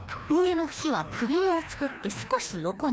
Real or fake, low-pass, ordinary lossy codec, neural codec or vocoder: fake; none; none; codec, 16 kHz, 1 kbps, FreqCodec, larger model